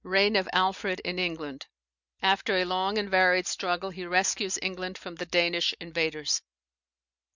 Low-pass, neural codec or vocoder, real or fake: 7.2 kHz; none; real